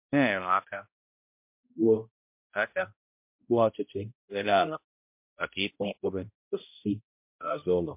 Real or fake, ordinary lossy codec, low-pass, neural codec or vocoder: fake; MP3, 32 kbps; 3.6 kHz; codec, 16 kHz, 0.5 kbps, X-Codec, HuBERT features, trained on balanced general audio